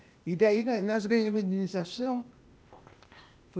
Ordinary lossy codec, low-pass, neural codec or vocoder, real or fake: none; none; codec, 16 kHz, 0.8 kbps, ZipCodec; fake